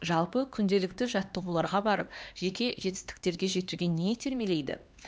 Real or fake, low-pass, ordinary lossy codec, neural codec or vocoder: fake; none; none; codec, 16 kHz, 2 kbps, X-Codec, HuBERT features, trained on LibriSpeech